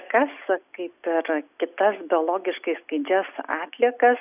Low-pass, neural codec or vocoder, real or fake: 3.6 kHz; none; real